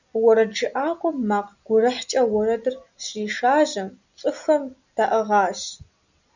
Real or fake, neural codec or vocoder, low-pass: real; none; 7.2 kHz